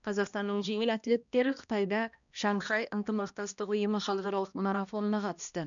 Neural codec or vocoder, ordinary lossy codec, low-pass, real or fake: codec, 16 kHz, 1 kbps, X-Codec, HuBERT features, trained on balanced general audio; none; 7.2 kHz; fake